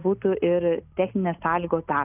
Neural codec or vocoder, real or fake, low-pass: none; real; 3.6 kHz